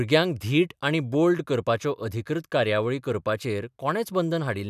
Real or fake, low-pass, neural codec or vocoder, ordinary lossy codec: real; 14.4 kHz; none; none